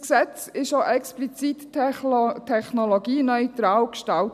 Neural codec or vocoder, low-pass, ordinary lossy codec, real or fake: none; 14.4 kHz; none; real